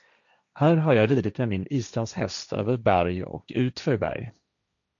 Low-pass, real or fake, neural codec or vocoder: 7.2 kHz; fake; codec, 16 kHz, 1.1 kbps, Voila-Tokenizer